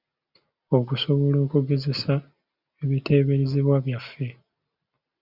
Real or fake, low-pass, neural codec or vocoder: real; 5.4 kHz; none